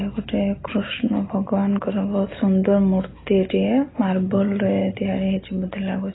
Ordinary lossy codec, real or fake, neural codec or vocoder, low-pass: AAC, 16 kbps; real; none; 7.2 kHz